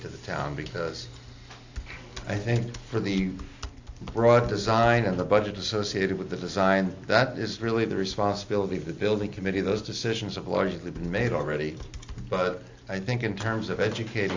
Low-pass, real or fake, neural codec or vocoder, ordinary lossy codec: 7.2 kHz; real; none; AAC, 48 kbps